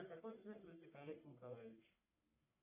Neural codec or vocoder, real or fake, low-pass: codec, 44.1 kHz, 1.7 kbps, Pupu-Codec; fake; 3.6 kHz